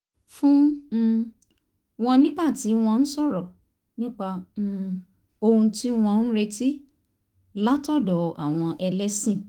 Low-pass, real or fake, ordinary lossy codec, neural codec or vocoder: 19.8 kHz; fake; Opus, 24 kbps; autoencoder, 48 kHz, 32 numbers a frame, DAC-VAE, trained on Japanese speech